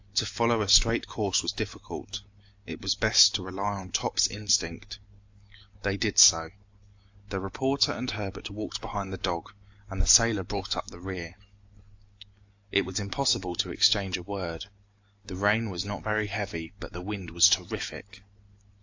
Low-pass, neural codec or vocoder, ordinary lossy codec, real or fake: 7.2 kHz; none; AAC, 48 kbps; real